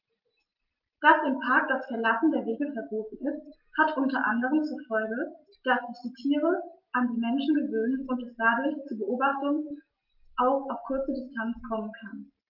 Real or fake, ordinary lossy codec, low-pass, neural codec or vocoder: real; Opus, 24 kbps; 5.4 kHz; none